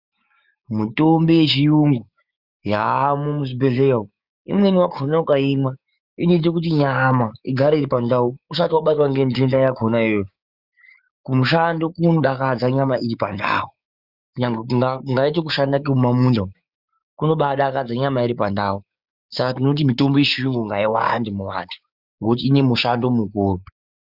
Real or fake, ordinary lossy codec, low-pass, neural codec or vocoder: fake; Opus, 64 kbps; 5.4 kHz; codec, 16 kHz, 6 kbps, DAC